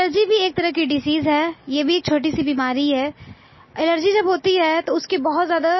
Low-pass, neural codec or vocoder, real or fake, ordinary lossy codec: 7.2 kHz; none; real; MP3, 24 kbps